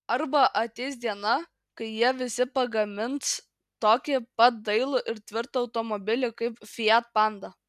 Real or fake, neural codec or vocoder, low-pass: real; none; 14.4 kHz